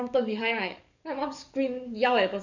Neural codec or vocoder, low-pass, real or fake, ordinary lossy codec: codec, 44.1 kHz, 7.8 kbps, DAC; 7.2 kHz; fake; none